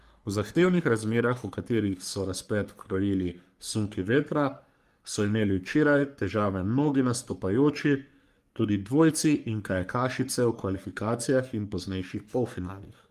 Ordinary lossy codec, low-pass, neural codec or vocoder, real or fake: Opus, 32 kbps; 14.4 kHz; codec, 44.1 kHz, 3.4 kbps, Pupu-Codec; fake